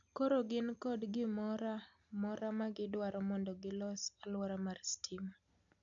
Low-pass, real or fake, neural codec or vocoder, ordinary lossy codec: 7.2 kHz; real; none; none